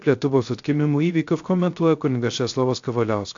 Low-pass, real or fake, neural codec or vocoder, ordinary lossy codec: 7.2 kHz; fake; codec, 16 kHz, 0.3 kbps, FocalCodec; AAC, 64 kbps